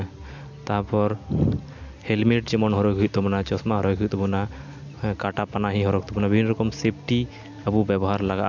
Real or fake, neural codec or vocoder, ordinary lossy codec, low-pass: real; none; MP3, 64 kbps; 7.2 kHz